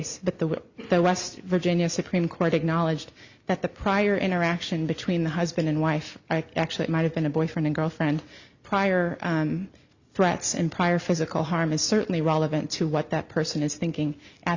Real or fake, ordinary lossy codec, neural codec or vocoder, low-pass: real; Opus, 64 kbps; none; 7.2 kHz